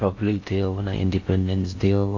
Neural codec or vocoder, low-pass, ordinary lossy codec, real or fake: codec, 16 kHz in and 24 kHz out, 0.6 kbps, FocalCodec, streaming, 4096 codes; 7.2 kHz; AAC, 48 kbps; fake